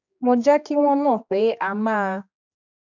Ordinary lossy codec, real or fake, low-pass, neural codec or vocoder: none; fake; 7.2 kHz; codec, 16 kHz, 2 kbps, X-Codec, HuBERT features, trained on general audio